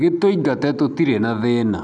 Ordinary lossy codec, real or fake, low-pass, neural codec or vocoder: none; real; 10.8 kHz; none